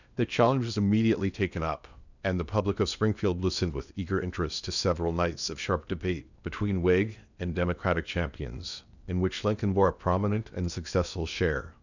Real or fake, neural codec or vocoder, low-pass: fake; codec, 16 kHz in and 24 kHz out, 0.8 kbps, FocalCodec, streaming, 65536 codes; 7.2 kHz